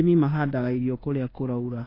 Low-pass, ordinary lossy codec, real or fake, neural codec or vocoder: 5.4 kHz; AAC, 24 kbps; fake; codec, 24 kHz, 1.2 kbps, DualCodec